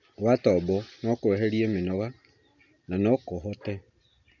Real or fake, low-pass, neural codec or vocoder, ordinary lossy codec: real; 7.2 kHz; none; none